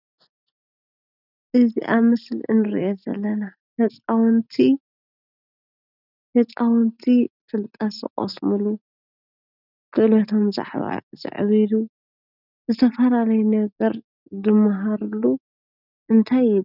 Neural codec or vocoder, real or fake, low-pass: none; real; 5.4 kHz